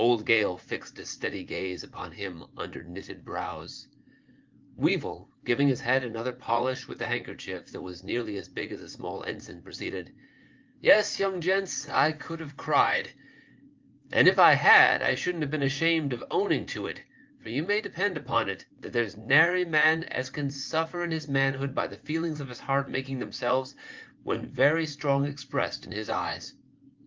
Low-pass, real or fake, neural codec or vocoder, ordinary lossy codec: 7.2 kHz; fake; vocoder, 44.1 kHz, 80 mel bands, Vocos; Opus, 32 kbps